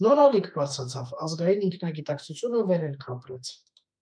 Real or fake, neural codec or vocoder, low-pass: fake; autoencoder, 48 kHz, 32 numbers a frame, DAC-VAE, trained on Japanese speech; 9.9 kHz